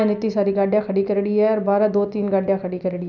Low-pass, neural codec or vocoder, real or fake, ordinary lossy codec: 7.2 kHz; none; real; none